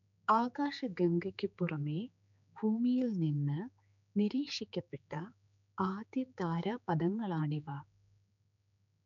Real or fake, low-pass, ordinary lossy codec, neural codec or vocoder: fake; 7.2 kHz; none; codec, 16 kHz, 4 kbps, X-Codec, HuBERT features, trained on general audio